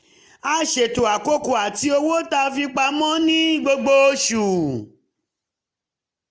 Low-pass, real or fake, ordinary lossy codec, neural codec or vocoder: none; real; none; none